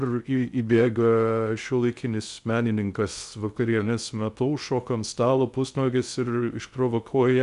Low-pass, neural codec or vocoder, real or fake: 10.8 kHz; codec, 16 kHz in and 24 kHz out, 0.6 kbps, FocalCodec, streaming, 2048 codes; fake